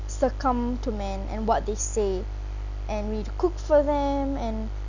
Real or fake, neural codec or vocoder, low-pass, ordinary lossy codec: real; none; 7.2 kHz; none